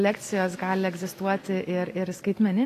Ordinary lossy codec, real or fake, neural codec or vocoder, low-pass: AAC, 48 kbps; real; none; 14.4 kHz